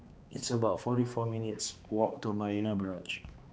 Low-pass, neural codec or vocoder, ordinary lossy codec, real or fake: none; codec, 16 kHz, 2 kbps, X-Codec, HuBERT features, trained on balanced general audio; none; fake